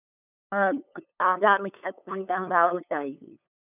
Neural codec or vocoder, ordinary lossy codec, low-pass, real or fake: codec, 16 kHz, 8 kbps, FunCodec, trained on LibriTTS, 25 frames a second; none; 3.6 kHz; fake